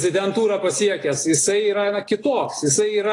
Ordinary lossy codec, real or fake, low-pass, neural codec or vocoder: AAC, 32 kbps; real; 10.8 kHz; none